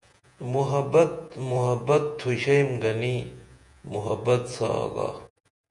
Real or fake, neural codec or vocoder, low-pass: fake; vocoder, 48 kHz, 128 mel bands, Vocos; 10.8 kHz